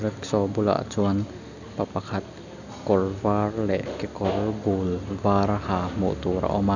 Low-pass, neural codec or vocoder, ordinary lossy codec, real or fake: 7.2 kHz; none; none; real